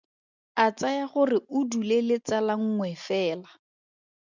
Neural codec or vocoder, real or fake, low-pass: none; real; 7.2 kHz